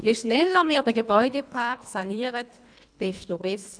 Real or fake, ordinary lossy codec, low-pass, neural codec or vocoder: fake; MP3, 96 kbps; 9.9 kHz; codec, 24 kHz, 1.5 kbps, HILCodec